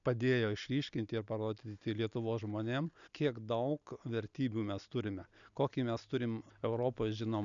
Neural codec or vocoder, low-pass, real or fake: none; 7.2 kHz; real